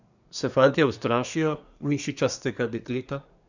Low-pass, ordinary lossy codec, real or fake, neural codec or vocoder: 7.2 kHz; none; fake; codec, 24 kHz, 1 kbps, SNAC